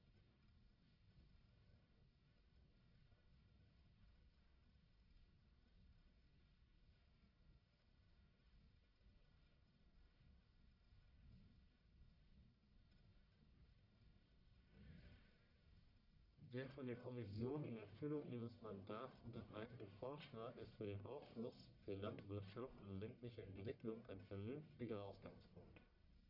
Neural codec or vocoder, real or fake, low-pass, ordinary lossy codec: codec, 44.1 kHz, 1.7 kbps, Pupu-Codec; fake; 5.4 kHz; none